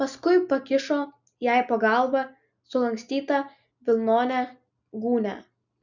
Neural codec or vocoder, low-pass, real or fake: none; 7.2 kHz; real